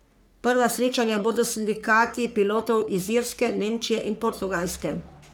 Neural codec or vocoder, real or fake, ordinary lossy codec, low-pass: codec, 44.1 kHz, 3.4 kbps, Pupu-Codec; fake; none; none